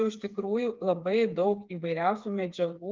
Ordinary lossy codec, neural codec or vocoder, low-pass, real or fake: Opus, 32 kbps; codec, 16 kHz, 4 kbps, FreqCodec, smaller model; 7.2 kHz; fake